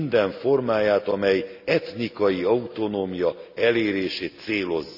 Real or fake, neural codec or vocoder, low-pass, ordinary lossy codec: real; none; 5.4 kHz; none